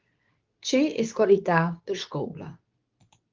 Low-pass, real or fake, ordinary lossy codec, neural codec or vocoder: 7.2 kHz; fake; Opus, 24 kbps; codec, 24 kHz, 0.9 kbps, WavTokenizer, medium speech release version 2